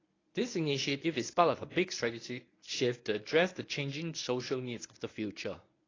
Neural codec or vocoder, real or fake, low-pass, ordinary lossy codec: codec, 24 kHz, 0.9 kbps, WavTokenizer, medium speech release version 1; fake; 7.2 kHz; AAC, 32 kbps